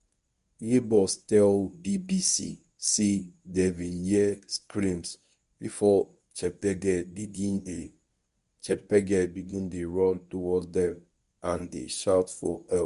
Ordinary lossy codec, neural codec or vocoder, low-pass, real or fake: none; codec, 24 kHz, 0.9 kbps, WavTokenizer, medium speech release version 1; 10.8 kHz; fake